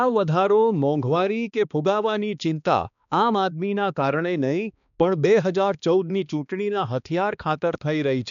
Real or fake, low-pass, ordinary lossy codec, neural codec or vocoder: fake; 7.2 kHz; none; codec, 16 kHz, 2 kbps, X-Codec, HuBERT features, trained on balanced general audio